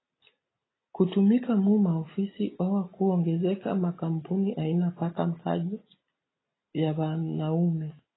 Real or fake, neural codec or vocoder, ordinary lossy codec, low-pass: real; none; AAC, 16 kbps; 7.2 kHz